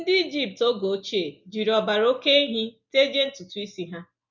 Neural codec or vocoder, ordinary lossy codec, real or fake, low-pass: none; none; real; 7.2 kHz